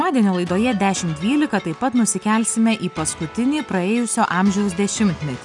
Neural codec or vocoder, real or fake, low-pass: none; real; 10.8 kHz